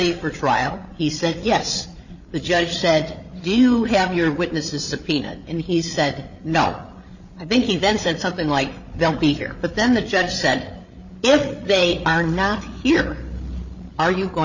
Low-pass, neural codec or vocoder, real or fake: 7.2 kHz; codec, 16 kHz, 8 kbps, FreqCodec, larger model; fake